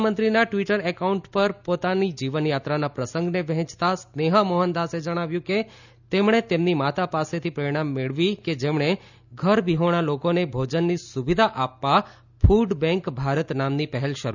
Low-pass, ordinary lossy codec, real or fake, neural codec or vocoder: 7.2 kHz; none; real; none